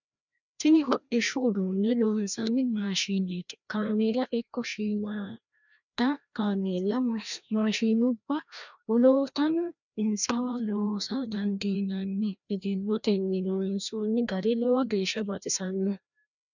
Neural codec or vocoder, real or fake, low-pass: codec, 16 kHz, 1 kbps, FreqCodec, larger model; fake; 7.2 kHz